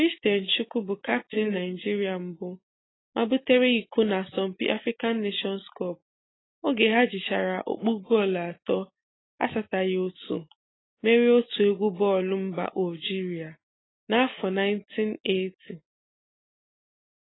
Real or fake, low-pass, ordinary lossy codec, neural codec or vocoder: real; 7.2 kHz; AAC, 16 kbps; none